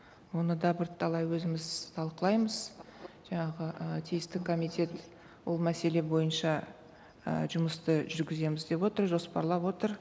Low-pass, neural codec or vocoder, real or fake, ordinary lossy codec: none; none; real; none